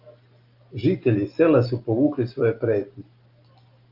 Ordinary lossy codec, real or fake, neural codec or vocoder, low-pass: Opus, 24 kbps; real; none; 5.4 kHz